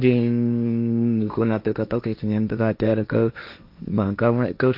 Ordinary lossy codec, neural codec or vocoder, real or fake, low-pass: MP3, 48 kbps; codec, 16 kHz, 1.1 kbps, Voila-Tokenizer; fake; 5.4 kHz